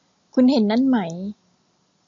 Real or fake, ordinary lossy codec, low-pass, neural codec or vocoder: real; MP3, 64 kbps; 7.2 kHz; none